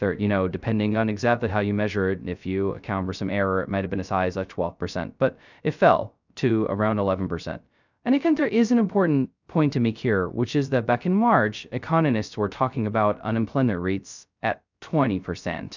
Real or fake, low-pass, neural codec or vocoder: fake; 7.2 kHz; codec, 16 kHz, 0.2 kbps, FocalCodec